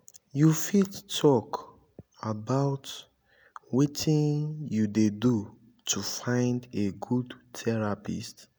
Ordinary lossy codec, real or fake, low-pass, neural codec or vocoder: none; real; none; none